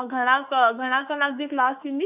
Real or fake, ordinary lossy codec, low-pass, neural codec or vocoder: fake; none; 3.6 kHz; autoencoder, 48 kHz, 32 numbers a frame, DAC-VAE, trained on Japanese speech